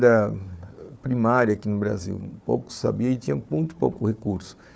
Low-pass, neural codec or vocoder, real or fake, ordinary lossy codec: none; codec, 16 kHz, 4 kbps, FunCodec, trained on Chinese and English, 50 frames a second; fake; none